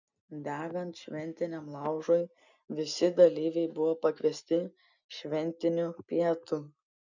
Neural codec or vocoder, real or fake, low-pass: none; real; 7.2 kHz